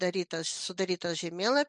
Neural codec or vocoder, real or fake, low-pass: none; real; 10.8 kHz